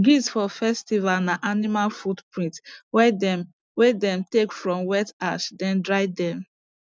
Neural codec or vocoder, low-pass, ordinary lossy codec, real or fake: none; none; none; real